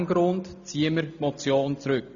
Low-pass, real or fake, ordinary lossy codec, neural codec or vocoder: 7.2 kHz; real; none; none